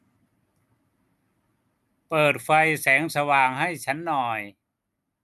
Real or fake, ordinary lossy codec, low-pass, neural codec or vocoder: real; none; 14.4 kHz; none